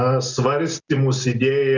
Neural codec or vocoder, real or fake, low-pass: none; real; 7.2 kHz